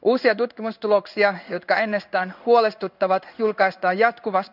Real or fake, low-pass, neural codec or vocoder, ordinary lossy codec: fake; 5.4 kHz; codec, 16 kHz in and 24 kHz out, 1 kbps, XY-Tokenizer; none